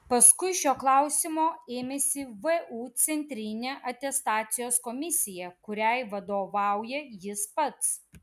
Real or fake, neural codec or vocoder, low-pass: real; none; 14.4 kHz